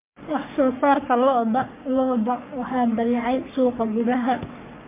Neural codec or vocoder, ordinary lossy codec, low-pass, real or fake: codec, 44.1 kHz, 3.4 kbps, Pupu-Codec; MP3, 32 kbps; 3.6 kHz; fake